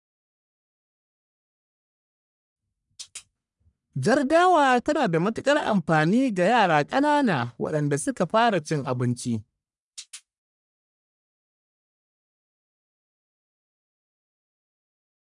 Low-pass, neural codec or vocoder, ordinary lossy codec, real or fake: 10.8 kHz; codec, 44.1 kHz, 1.7 kbps, Pupu-Codec; none; fake